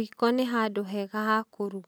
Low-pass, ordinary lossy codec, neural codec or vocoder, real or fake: none; none; none; real